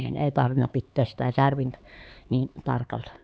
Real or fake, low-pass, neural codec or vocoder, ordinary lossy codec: fake; none; codec, 16 kHz, 4 kbps, X-Codec, HuBERT features, trained on LibriSpeech; none